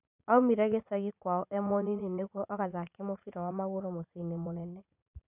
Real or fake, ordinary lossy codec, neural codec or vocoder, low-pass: fake; none; vocoder, 44.1 kHz, 80 mel bands, Vocos; 3.6 kHz